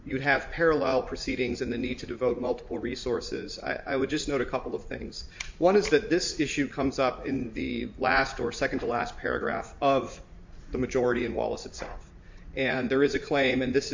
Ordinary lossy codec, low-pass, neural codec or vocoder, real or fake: MP3, 48 kbps; 7.2 kHz; vocoder, 44.1 kHz, 80 mel bands, Vocos; fake